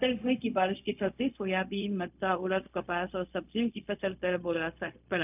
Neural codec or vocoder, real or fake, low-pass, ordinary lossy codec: codec, 16 kHz, 0.4 kbps, LongCat-Audio-Codec; fake; 3.6 kHz; none